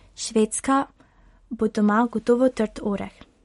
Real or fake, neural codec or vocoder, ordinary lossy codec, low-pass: real; none; MP3, 48 kbps; 19.8 kHz